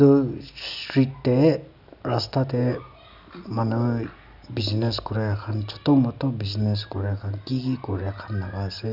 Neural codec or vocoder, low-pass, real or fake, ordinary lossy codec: none; 5.4 kHz; real; none